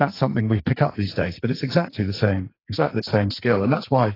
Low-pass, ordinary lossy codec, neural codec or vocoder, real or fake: 5.4 kHz; AAC, 24 kbps; codec, 44.1 kHz, 2.6 kbps, SNAC; fake